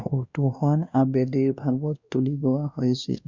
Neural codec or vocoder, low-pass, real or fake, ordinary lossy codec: codec, 16 kHz, 1 kbps, X-Codec, WavLM features, trained on Multilingual LibriSpeech; 7.2 kHz; fake; Opus, 64 kbps